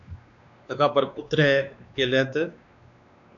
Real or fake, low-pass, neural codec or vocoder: fake; 7.2 kHz; codec, 16 kHz, 2 kbps, X-Codec, WavLM features, trained on Multilingual LibriSpeech